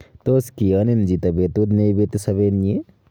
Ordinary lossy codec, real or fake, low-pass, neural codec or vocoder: none; real; none; none